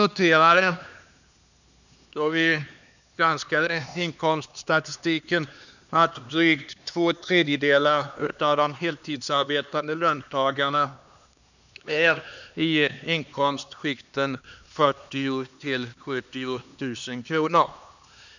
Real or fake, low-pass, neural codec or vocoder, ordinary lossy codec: fake; 7.2 kHz; codec, 16 kHz, 2 kbps, X-Codec, HuBERT features, trained on LibriSpeech; none